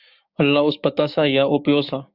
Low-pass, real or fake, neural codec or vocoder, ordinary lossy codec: 5.4 kHz; fake; codec, 16 kHz, 6 kbps, DAC; Opus, 64 kbps